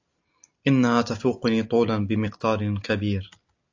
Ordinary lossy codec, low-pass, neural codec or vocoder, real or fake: AAC, 48 kbps; 7.2 kHz; none; real